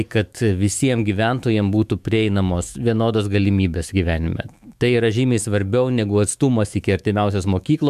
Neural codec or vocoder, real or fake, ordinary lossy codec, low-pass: autoencoder, 48 kHz, 128 numbers a frame, DAC-VAE, trained on Japanese speech; fake; MP3, 96 kbps; 14.4 kHz